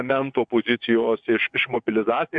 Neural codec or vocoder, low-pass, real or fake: vocoder, 24 kHz, 100 mel bands, Vocos; 9.9 kHz; fake